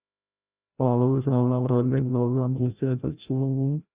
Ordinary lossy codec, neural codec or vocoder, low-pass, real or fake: Opus, 64 kbps; codec, 16 kHz, 0.5 kbps, FreqCodec, larger model; 3.6 kHz; fake